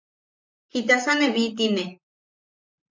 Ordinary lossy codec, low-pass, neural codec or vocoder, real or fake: MP3, 64 kbps; 7.2 kHz; vocoder, 44.1 kHz, 128 mel bands, Pupu-Vocoder; fake